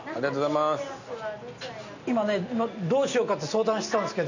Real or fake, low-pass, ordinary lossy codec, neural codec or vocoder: real; 7.2 kHz; none; none